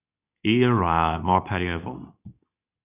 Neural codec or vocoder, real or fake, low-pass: codec, 24 kHz, 0.9 kbps, WavTokenizer, medium speech release version 2; fake; 3.6 kHz